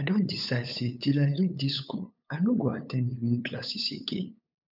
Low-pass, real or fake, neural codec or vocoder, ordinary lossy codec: 5.4 kHz; fake; codec, 16 kHz, 8 kbps, FunCodec, trained on LibriTTS, 25 frames a second; none